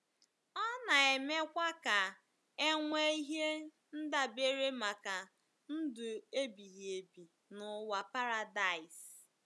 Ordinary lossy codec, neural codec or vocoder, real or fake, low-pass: none; none; real; none